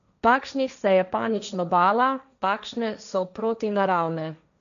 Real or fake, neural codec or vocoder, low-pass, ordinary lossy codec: fake; codec, 16 kHz, 1.1 kbps, Voila-Tokenizer; 7.2 kHz; none